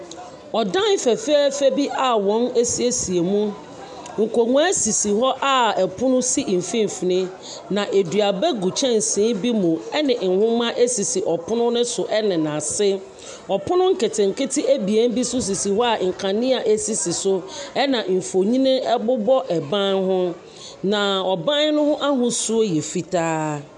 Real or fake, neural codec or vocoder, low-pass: real; none; 10.8 kHz